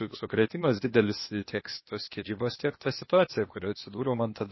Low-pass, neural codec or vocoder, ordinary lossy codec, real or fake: 7.2 kHz; codec, 16 kHz, 0.8 kbps, ZipCodec; MP3, 24 kbps; fake